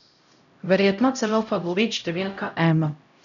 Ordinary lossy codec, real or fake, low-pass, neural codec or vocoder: none; fake; 7.2 kHz; codec, 16 kHz, 0.5 kbps, X-Codec, HuBERT features, trained on LibriSpeech